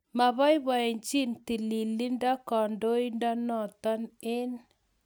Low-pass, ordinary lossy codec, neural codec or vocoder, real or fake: none; none; none; real